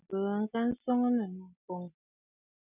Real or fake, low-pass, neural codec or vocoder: real; 3.6 kHz; none